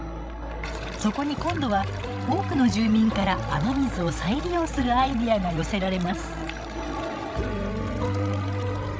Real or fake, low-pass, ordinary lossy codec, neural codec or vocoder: fake; none; none; codec, 16 kHz, 16 kbps, FreqCodec, larger model